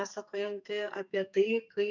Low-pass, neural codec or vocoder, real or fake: 7.2 kHz; codec, 44.1 kHz, 2.6 kbps, SNAC; fake